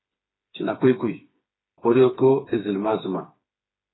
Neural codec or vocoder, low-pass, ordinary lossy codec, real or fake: codec, 16 kHz, 4 kbps, FreqCodec, smaller model; 7.2 kHz; AAC, 16 kbps; fake